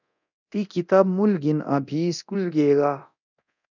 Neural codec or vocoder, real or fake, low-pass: codec, 24 kHz, 0.9 kbps, DualCodec; fake; 7.2 kHz